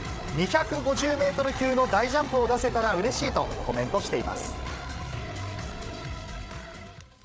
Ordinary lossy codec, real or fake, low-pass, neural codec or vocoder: none; fake; none; codec, 16 kHz, 8 kbps, FreqCodec, larger model